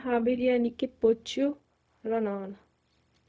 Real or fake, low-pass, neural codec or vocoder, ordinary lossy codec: fake; none; codec, 16 kHz, 0.4 kbps, LongCat-Audio-Codec; none